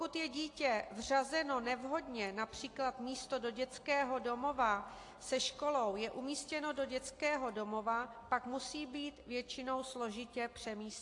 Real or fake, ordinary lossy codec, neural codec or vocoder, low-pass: real; AAC, 48 kbps; none; 10.8 kHz